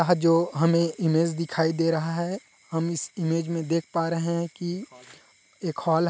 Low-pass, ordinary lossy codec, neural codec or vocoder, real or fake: none; none; none; real